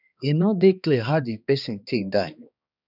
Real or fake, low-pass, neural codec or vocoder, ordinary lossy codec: fake; 5.4 kHz; codec, 16 kHz, 2 kbps, X-Codec, HuBERT features, trained on balanced general audio; none